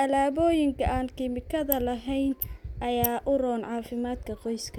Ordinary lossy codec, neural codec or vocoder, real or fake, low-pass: none; none; real; 19.8 kHz